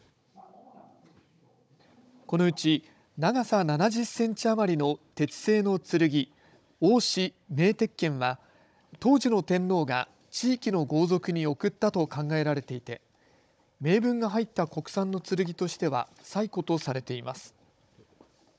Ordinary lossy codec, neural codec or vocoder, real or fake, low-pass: none; codec, 16 kHz, 16 kbps, FunCodec, trained on Chinese and English, 50 frames a second; fake; none